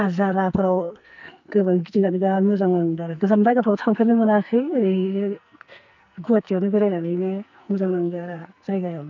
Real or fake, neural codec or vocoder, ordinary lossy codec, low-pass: fake; codec, 44.1 kHz, 2.6 kbps, SNAC; none; 7.2 kHz